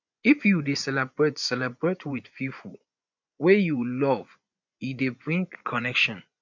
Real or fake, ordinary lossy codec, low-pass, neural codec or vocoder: fake; MP3, 64 kbps; 7.2 kHz; vocoder, 24 kHz, 100 mel bands, Vocos